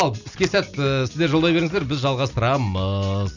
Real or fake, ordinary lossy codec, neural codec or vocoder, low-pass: real; none; none; 7.2 kHz